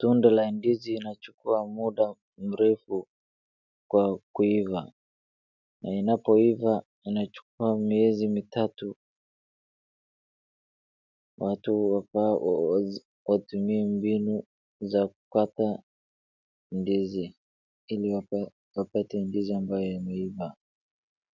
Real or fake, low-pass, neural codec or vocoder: real; 7.2 kHz; none